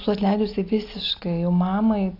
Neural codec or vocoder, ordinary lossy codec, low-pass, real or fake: none; AAC, 32 kbps; 5.4 kHz; real